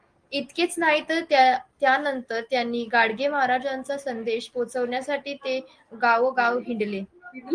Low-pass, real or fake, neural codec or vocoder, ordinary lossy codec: 9.9 kHz; real; none; Opus, 24 kbps